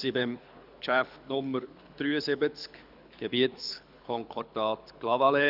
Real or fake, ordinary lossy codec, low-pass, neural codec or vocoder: fake; none; 5.4 kHz; codec, 24 kHz, 6 kbps, HILCodec